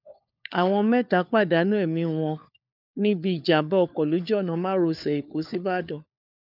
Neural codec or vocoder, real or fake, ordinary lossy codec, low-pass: codec, 16 kHz, 4 kbps, FunCodec, trained on LibriTTS, 50 frames a second; fake; none; 5.4 kHz